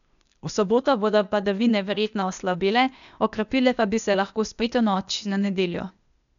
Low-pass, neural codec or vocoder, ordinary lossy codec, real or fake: 7.2 kHz; codec, 16 kHz, 0.8 kbps, ZipCodec; none; fake